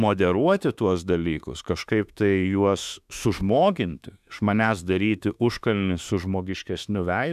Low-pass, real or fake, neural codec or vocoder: 14.4 kHz; fake; autoencoder, 48 kHz, 32 numbers a frame, DAC-VAE, trained on Japanese speech